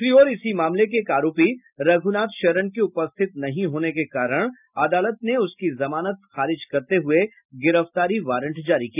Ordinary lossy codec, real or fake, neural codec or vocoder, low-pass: none; real; none; 3.6 kHz